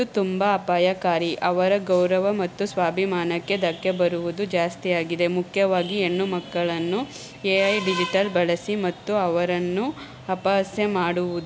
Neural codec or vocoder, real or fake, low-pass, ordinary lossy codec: none; real; none; none